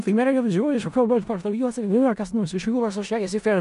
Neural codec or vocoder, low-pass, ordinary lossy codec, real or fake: codec, 16 kHz in and 24 kHz out, 0.4 kbps, LongCat-Audio-Codec, four codebook decoder; 10.8 kHz; AAC, 96 kbps; fake